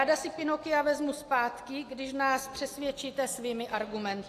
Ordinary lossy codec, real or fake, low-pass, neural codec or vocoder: AAC, 64 kbps; real; 14.4 kHz; none